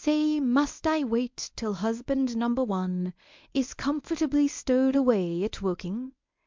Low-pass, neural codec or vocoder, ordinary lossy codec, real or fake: 7.2 kHz; codec, 24 kHz, 0.9 kbps, WavTokenizer, medium speech release version 1; MP3, 64 kbps; fake